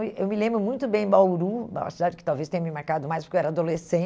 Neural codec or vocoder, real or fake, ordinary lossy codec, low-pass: none; real; none; none